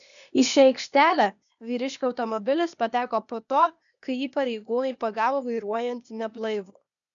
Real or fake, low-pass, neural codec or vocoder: fake; 7.2 kHz; codec, 16 kHz, 0.8 kbps, ZipCodec